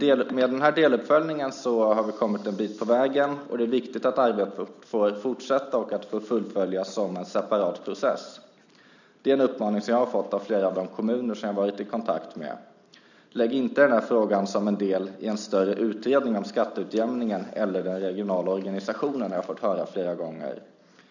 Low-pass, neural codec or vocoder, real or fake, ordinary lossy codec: 7.2 kHz; none; real; none